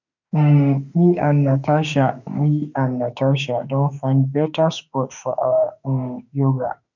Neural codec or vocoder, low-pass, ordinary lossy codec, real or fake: autoencoder, 48 kHz, 32 numbers a frame, DAC-VAE, trained on Japanese speech; 7.2 kHz; none; fake